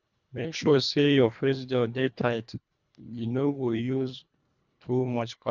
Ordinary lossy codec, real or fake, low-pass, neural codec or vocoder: none; fake; 7.2 kHz; codec, 24 kHz, 1.5 kbps, HILCodec